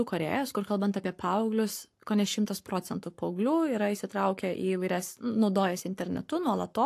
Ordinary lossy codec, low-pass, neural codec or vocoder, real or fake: AAC, 64 kbps; 14.4 kHz; codec, 44.1 kHz, 7.8 kbps, Pupu-Codec; fake